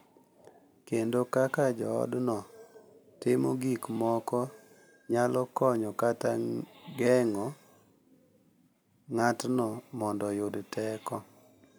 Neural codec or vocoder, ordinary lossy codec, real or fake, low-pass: none; none; real; none